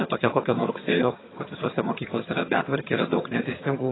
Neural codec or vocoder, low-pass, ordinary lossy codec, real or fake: vocoder, 22.05 kHz, 80 mel bands, HiFi-GAN; 7.2 kHz; AAC, 16 kbps; fake